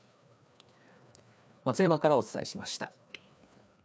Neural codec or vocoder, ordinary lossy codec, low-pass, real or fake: codec, 16 kHz, 2 kbps, FreqCodec, larger model; none; none; fake